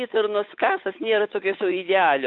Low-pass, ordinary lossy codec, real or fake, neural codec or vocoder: 7.2 kHz; AAC, 48 kbps; fake; codec, 16 kHz, 8 kbps, FunCodec, trained on Chinese and English, 25 frames a second